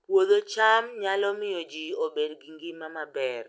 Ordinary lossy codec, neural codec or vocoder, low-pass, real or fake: none; none; none; real